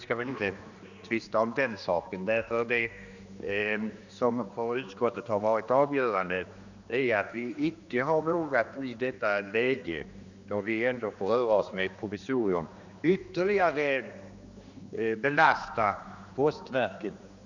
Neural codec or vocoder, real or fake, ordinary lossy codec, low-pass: codec, 16 kHz, 2 kbps, X-Codec, HuBERT features, trained on general audio; fake; none; 7.2 kHz